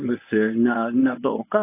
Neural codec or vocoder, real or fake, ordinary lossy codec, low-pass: codec, 24 kHz, 0.9 kbps, WavTokenizer, medium speech release version 1; fake; AAC, 24 kbps; 3.6 kHz